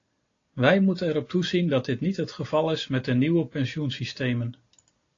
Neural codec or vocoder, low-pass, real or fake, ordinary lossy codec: none; 7.2 kHz; real; AAC, 32 kbps